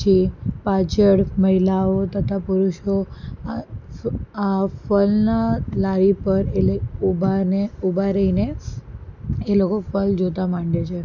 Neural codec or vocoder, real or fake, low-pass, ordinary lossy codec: none; real; 7.2 kHz; none